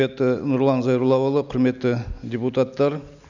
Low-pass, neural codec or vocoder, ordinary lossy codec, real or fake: 7.2 kHz; none; none; real